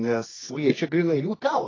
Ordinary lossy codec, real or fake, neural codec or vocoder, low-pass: AAC, 32 kbps; fake; codec, 24 kHz, 0.9 kbps, WavTokenizer, medium music audio release; 7.2 kHz